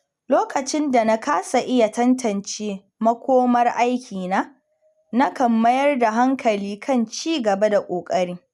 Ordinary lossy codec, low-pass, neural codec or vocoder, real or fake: none; none; none; real